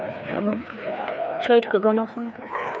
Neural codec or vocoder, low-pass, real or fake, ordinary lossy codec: codec, 16 kHz, 2 kbps, FreqCodec, larger model; none; fake; none